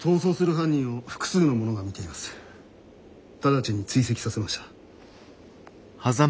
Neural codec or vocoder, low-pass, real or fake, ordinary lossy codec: none; none; real; none